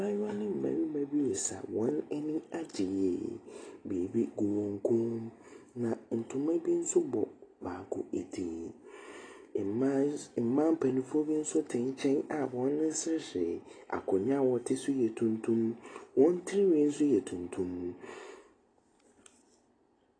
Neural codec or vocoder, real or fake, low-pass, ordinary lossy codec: none; real; 9.9 kHz; AAC, 32 kbps